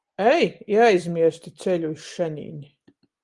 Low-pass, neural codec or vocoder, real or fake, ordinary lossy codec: 10.8 kHz; none; real; Opus, 24 kbps